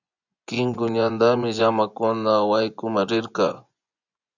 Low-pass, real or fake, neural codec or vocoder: 7.2 kHz; fake; vocoder, 24 kHz, 100 mel bands, Vocos